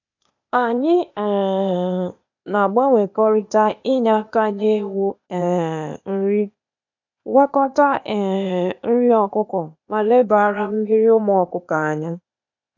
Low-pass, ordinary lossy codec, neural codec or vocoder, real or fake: 7.2 kHz; none; codec, 16 kHz, 0.8 kbps, ZipCodec; fake